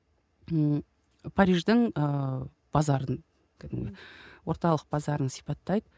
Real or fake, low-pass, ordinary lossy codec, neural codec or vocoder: real; none; none; none